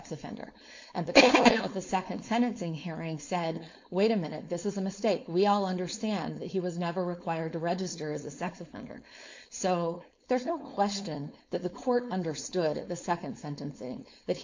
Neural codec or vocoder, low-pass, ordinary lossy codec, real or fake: codec, 16 kHz, 4.8 kbps, FACodec; 7.2 kHz; MP3, 48 kbps; fake